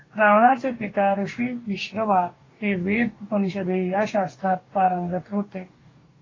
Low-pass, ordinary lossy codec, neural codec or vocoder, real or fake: 7.2 kHz; AAC, 32 kbps; codec, 44.1 kHz, 2.6 kbps, DAC; fake